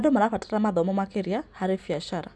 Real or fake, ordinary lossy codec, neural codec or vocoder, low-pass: real; none; none; none